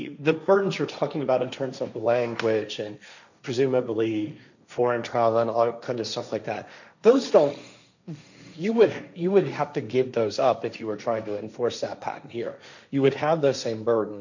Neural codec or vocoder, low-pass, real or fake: codec, 16 kHz, 1.1 kbps, Voila-Tokenizer; 7.2 kHz; fake